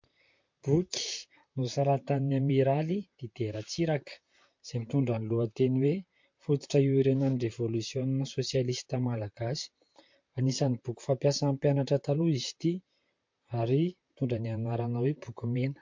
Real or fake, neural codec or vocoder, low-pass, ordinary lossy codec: fake; vocoder, 44.1 kHz, 128 mel bands, Pupu-Vocoder; 7.2 kHz; MP3, 48 kbps